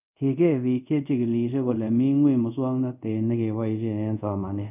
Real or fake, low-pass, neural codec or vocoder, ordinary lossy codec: fake; 3.6 kHz; codec, 24 kHz, 0.5 kbps, DualCodec; none